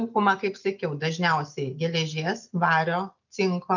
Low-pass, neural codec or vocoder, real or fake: 7.2 kHz; none; real